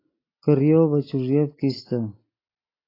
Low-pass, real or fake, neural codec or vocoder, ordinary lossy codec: 5.4 kHz; real; none; AAC, 24 kbps